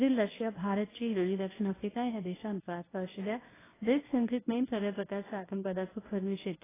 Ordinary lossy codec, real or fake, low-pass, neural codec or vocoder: AAC, 16 kbps; fake; 3.6 kHz; codec, 24 kHz, 0.9 kbps, WavTokenizer, medium speech release version 1